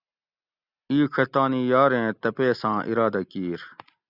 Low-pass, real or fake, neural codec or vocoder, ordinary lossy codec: 5.4 kHz; real; none; Opus, 64 kbps